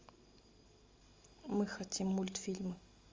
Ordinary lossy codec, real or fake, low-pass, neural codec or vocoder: Opus, 32 kbps; real; 7.2 kHz; none